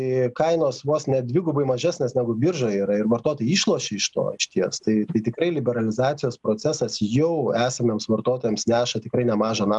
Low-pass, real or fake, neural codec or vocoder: 10.8 kHz; real; none